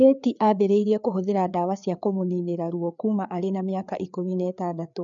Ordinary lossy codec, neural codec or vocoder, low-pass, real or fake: none; codec, 16 kHz, 4 kbps, FreqCodec, larger model; 7.2 kHz; fake